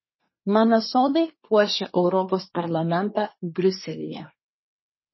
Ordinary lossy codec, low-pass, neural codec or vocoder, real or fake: MP3, 24 kbps; 7.2 kHz; codec, 24 kHz, 1 kbps, SNAC; fake